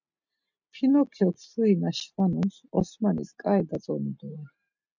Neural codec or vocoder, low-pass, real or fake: none; 7.2 kHz; real